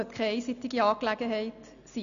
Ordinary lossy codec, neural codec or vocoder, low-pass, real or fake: none; none; 7.2 kHz; real